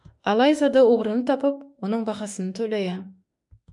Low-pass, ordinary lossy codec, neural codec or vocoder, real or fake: 10.8 kHz; MP3, 96 kbps; autoencoder, 48 kHz, 32 numbers a frame, DAC-VAE, trained on Japanese speech; fake